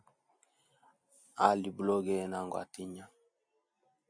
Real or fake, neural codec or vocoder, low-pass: real; none; 9.9 kHz